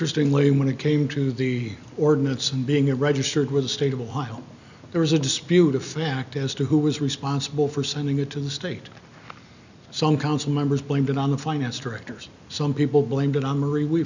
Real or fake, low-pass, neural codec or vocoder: real; 7.2 kHz; none